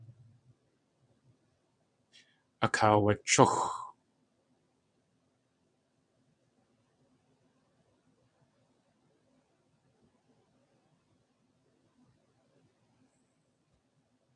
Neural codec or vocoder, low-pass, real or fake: vocoder, 22.05 kHz, 80 mel bands, WaveNeXt; 9.9 kHz; fake